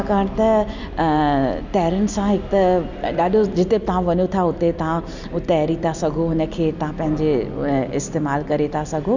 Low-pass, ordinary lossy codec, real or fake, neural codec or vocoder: 7.2 kHz; none; real; none